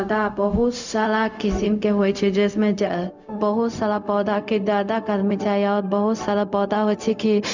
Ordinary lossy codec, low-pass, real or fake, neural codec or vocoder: none; 7.2 kHz; fake; codec, 16 kHz, 0.4 kbps, LongCat-Audio-Codec